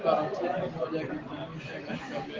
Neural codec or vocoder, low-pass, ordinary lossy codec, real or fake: none; 7.2 kHz; Opus, 16 kbps; real